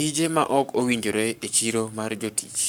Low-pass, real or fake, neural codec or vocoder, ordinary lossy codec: none; fake; codec, 44.1 kHz, 7.8 kbps, Pupu-Codec; none